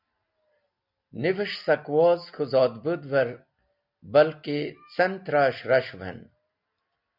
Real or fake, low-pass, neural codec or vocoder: real; 5.4 kHz; none